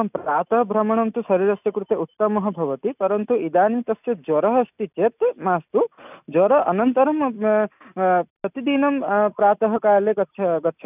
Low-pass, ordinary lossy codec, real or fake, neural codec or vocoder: 3.6 kHz; none; real; none